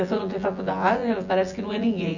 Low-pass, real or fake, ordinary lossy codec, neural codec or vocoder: 7.2 kHz; fake; none; vocoder, 24 kHz, 100 mel bands, Vocos